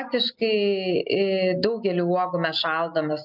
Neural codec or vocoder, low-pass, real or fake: none; 5.4 kHz; real